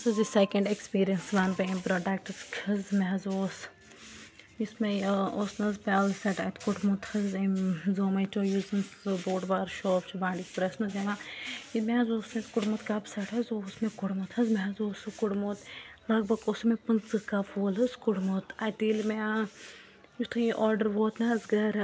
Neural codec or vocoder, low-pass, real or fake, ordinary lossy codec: none; none; real; none